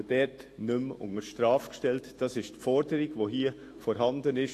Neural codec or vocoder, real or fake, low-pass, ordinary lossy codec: autoencoder, 48 kHz, 128 numbers a frame, DAC-VAE, trained on Japanese speech; fake; 14.4 kHz; AAC, 48 kbps